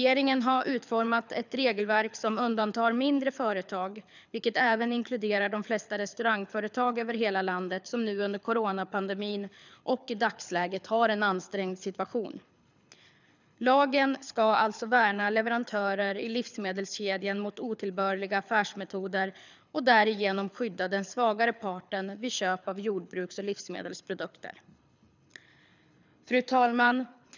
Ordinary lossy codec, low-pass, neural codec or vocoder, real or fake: none; 7.2 kHz; codec, 24 kHz, 6 kbps, HILCodec; fake